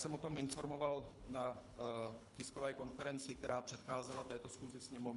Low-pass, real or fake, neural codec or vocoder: 10.8 kHz; fake; codec, 24 kHz, 3 kbps, HILCodec